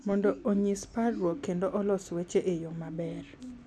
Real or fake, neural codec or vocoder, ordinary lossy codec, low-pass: real; none; none; none